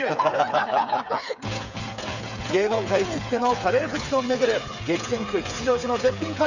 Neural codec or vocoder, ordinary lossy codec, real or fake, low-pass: codec, 16 kHz, 8 kbps, FreqCodec, smaller model; none; fake; 7.2 kHz